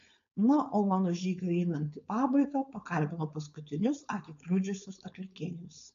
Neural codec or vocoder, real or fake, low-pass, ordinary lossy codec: codec, 16 kHz, 4.8 kbps, FACodec; fake; 7.2 kHz; MP3, 64 kbps